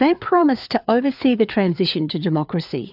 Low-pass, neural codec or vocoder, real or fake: 5.4 kHz; codec, 16 kHz, 4 kbps, FreqCodec, larger model; fake